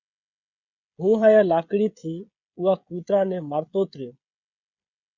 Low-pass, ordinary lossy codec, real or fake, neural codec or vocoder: 7.2 kHz; Opus, 64 kbps; fake; codec, 16 kHz, 16 kbps, FreqCodec, smaller model